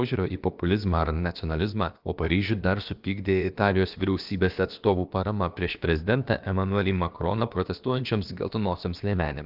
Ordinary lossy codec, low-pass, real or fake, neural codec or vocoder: Opus, 32 kbps; 5.4 kHz; fake; codec, 16 kHz, about 1 kbps, DyCAST, with the encoder's durations